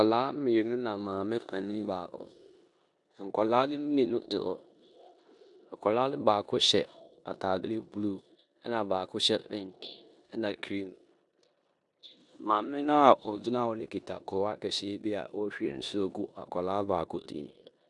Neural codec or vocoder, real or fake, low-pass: codec, 16 kHz in and 24 kHz out, 0.9 kbps, LongCat-Audio-Codec, four codebook decoder; fake; 10.8 kHz